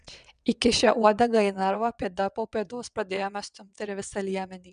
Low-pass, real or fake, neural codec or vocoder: 9.9 kHz; fake; vocoder, 22.05 kHz, 80 mel bands, WaveNeXt